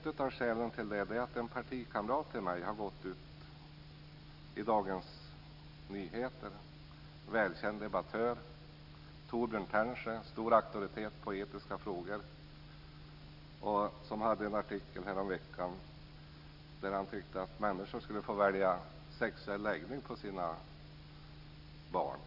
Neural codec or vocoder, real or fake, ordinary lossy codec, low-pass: none; real; none; 5.4 kHz